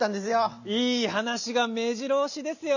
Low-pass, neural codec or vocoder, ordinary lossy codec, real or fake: 7.2 kHz; none; none; real